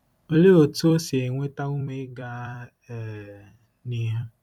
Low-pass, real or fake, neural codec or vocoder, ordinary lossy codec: 19.8 kHz; fake; vocoder, 44.1 kHz, 128 mel bands every 256 samples, BigVGAN v2; none